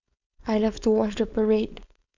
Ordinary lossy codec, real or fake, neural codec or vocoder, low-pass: none; fake; codec, 16 kHz, 4.8 kbps, FACodec; 7.2 kHz